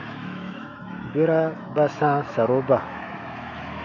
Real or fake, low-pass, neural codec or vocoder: fake; 7.2 kHz; autoencoder, 48 kHz, 128 numbers a frame, DAC-VAE, trained on Japanese speech